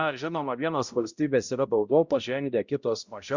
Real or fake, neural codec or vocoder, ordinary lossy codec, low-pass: fake; codec, 16 kHz, 0.5 kbps, X-Codec, HuBERT features, trained on balanced general audio; Opus, 64 kbps; 7.2 kHz